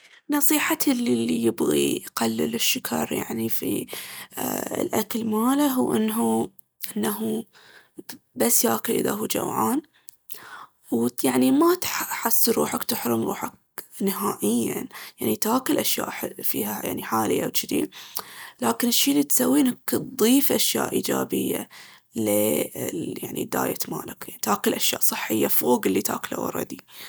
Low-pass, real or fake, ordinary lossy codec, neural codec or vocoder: none; real; none; none